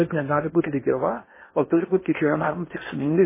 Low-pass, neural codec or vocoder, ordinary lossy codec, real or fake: 3.6 kHz; codec, 16 kHz in and 24 kHz out, 0.8 kbps, FocalCodec, streaming, 65536 codes; MP3, 16 kbps; fake